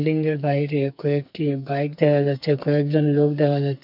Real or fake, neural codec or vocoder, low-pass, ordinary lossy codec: fake; codec, 44.1 kHz, 2.6 kbps, SNAC; 5.4 kHz; none